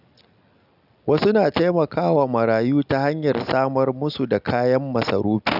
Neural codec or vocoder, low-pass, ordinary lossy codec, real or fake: none; 5.4 kHz; none; real